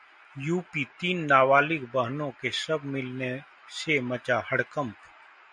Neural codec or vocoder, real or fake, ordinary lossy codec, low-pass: none; real; MP3, 64 kbps; 9.9 kHz